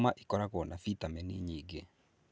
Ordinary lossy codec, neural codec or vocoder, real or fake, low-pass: none; none; real; none